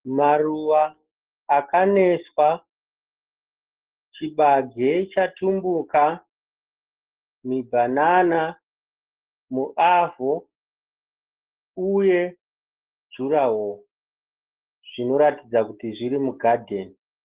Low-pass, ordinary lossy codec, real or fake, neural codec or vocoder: 3.6 kHz; Opus, 16 kbps; real; none